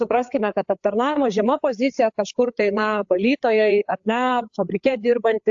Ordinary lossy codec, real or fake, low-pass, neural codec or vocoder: AAC, 64 kbps; fake; 7.2 kHz; codec, 16 kHz, 4 kbps, FreqCodec, larger model